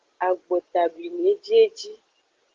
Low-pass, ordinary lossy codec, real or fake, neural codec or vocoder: 7.2 kHz; Opus, 16 kbps; real; none